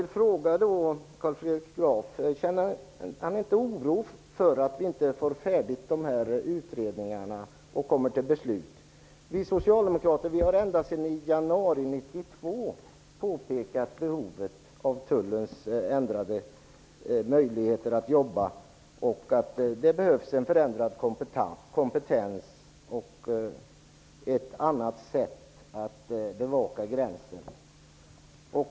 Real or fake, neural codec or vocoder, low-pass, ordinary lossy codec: real; none; none; none